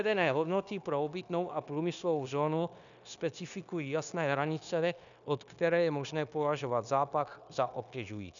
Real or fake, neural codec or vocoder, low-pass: fake; codec, 16 kHz, 0.9 kbps, LongCat-Audio-Codec; 7.2 kHz